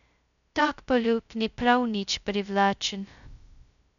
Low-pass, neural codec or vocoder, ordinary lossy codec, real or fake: 7.2 kHz; codec, 16 kHz, 0.2 kbps, FocalCodec; none; fake